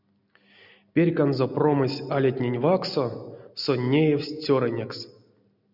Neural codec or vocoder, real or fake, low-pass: none; real; 5.4 kHz